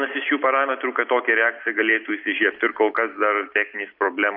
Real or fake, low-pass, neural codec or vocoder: real; 5.4 kHz; none